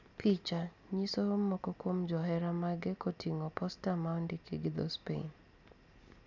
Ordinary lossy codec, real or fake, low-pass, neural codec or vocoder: none; real; 7.2 kHz; none